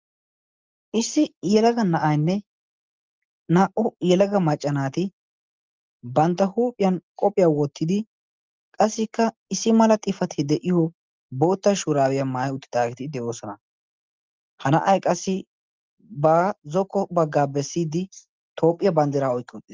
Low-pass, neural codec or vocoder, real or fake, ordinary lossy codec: 7.2 kHz; none; real; Opus, 32 kbps